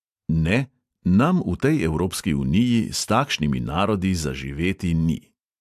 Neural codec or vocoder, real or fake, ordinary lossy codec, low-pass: none; real; AAC, 96 kbps; 14.4 kHz